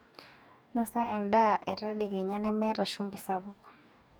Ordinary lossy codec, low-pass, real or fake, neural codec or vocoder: none; none; fake; codec, 44.1 kHz, 2.6 kbps, DAC